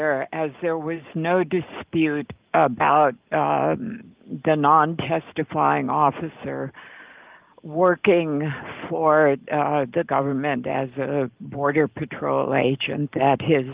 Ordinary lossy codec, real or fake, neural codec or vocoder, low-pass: Opus, 32 kbps; real; none; 3.6 kHz